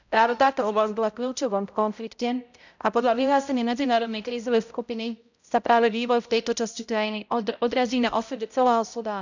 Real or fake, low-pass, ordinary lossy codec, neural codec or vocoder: fake; 7.2 kHz; none; codec, 16 kHz, 0.5 kbps, X-Codec, HuBERT features, trained on balanced general audio